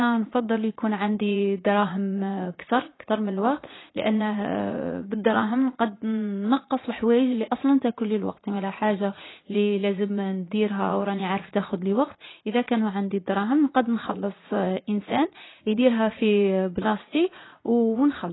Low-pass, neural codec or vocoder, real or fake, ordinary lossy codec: 7.2 kHz; vocoder, 44.1 kHz, 80 mel bands, Vocos; fake; AAC, 16 kbps